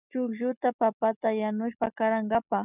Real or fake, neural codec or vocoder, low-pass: real; none; 3.6 kHz